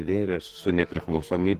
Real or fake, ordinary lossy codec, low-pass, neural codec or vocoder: fake; Opus, 16 kbps; 14.4 kHz; codec, 44.1 kHz, 2.6 kbps, SNAC